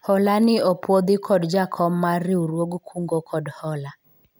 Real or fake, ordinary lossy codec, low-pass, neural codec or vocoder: real; none; none; none